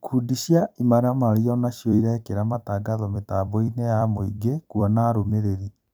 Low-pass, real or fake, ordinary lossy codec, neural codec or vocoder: none; fake; none; vocoder, 44.1 kHz, 128 mel bands every 256 samples, BigVGAN v2